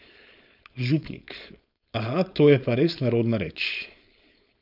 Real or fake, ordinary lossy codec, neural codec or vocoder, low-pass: fake; none; codec, 16 kHz, 4.8 kbps, FACodec; 5.4 kHz